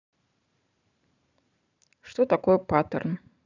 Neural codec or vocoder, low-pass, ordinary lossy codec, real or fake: vocoder, 44.1 kHz, 128 mel bands every 512 samples, BigVGAN v2; 7.2 kHz; none; fake